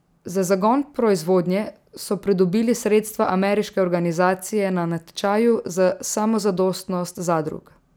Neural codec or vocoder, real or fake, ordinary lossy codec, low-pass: none; real; none; none